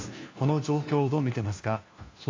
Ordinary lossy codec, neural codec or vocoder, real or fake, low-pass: AAC, 32 kbps; codec, 16 kHz, 1 kbps, FunCodec, trained on LibriTTS, 50 frames a second; fake; 7.2 kHz